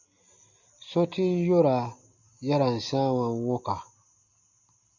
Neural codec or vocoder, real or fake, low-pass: none; real; 7.2 kHz